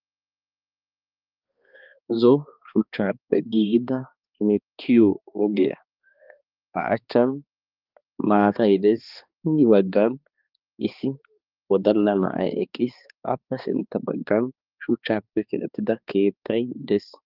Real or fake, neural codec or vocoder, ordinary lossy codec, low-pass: fake; codec, 16 kHz, 2 kbps, X-Codec, HuBERT features, trained on balanced general audio; Opus, 24 kbps; 5.4 kHz